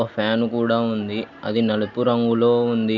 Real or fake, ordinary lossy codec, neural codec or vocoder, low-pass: real; none; none; 7.2 kHz